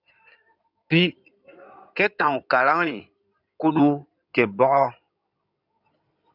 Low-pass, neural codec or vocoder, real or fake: 5.4 kHz; codec, 16 kHz in and 24 kHz out, 2.2 kbps, FireRedTTS-2 codec; fake